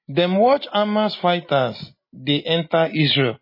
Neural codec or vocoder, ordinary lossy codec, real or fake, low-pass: none; MP3, 24 kbps; real; 5.4 kHz